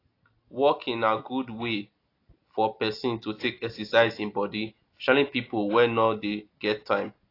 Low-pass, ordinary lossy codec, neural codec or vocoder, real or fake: 5.4 kHz; AAC, 32 kbps; none; real